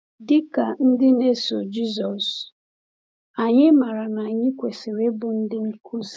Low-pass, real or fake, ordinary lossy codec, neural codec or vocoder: none; fake; none; codec, 16 kHz, 6 kbps, DAC